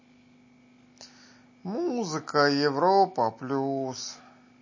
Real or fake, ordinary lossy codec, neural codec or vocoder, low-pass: real; MP3, 32 kbps; none; 7.2 kHz